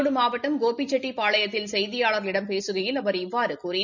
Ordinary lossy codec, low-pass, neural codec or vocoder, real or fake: none; 7.2 kHz; none; real